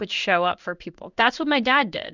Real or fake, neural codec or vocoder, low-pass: fake; codec, 16 kHz in and 24 kHz out, 1 kbps, XY-Tokenizer; 7.2 kHz